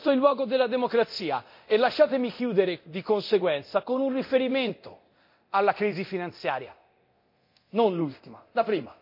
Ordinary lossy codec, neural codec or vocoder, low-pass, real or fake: MP3, 32 kbps; codec, 24 kHz, 0.9 kbps, DualCodec; 5.4 kHz; fake